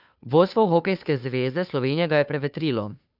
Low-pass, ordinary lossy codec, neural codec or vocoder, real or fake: 5.4 kHz; none; codec, 16 kHz, 2 kbps, FunCodec, trained on Chinese and English, 25 frames a second; fake